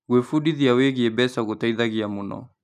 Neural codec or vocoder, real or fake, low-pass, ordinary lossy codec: none; real; 14.4 kHz; none